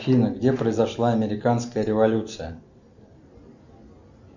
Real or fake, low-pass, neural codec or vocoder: real; 7.2 kHz; none